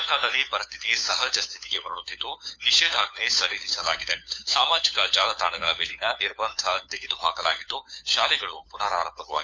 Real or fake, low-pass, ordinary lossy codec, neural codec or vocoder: fake; none; none; codec, 16 kHz, 4 kbps, FunCodec, trained on LibriTTS, 50 frames a second